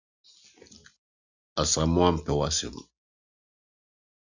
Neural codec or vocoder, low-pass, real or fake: none; 7.2 kHz; real